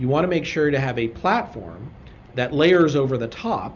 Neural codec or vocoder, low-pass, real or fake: none; 7.2 kHz; real